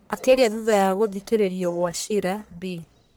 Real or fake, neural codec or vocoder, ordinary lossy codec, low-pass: fake; codec, 44.1 kHz, 1.7 kbps, Pupu-Codec; none; none